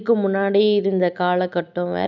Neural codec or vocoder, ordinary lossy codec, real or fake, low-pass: none; none; real; 7.2 kHz